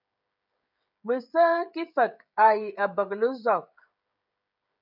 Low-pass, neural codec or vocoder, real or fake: 5.4 kHz; codec, 16 kHz, 16 kbps, FreqCodec, smaller model; fake